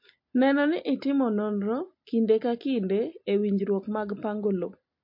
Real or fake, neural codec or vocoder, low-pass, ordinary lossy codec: real; none; 5.4 kHz; MP3, 32 kbps